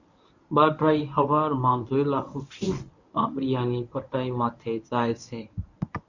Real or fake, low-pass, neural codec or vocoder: fake; 7.2 kHz; codec, 24 kHz, 0.9 kbps, WavTokenizer, medium speech release version 1